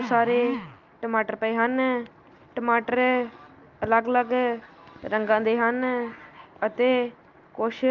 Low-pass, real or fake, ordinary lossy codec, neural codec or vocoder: 7.2 kHz; real; Opus, 24 kbps; none